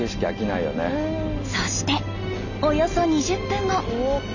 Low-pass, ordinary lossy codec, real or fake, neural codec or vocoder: 7.2 kHz; none; real; none